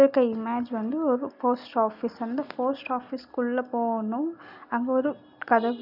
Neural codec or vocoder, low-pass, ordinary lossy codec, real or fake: none; 5.4 kHz; none; real